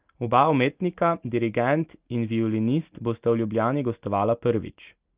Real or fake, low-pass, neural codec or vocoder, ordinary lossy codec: real; 3.6 kHz; none; Opus, 24 kbps